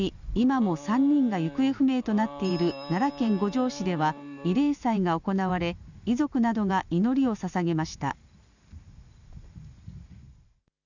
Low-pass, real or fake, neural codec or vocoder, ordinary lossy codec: 7.2 kHz; real; none; none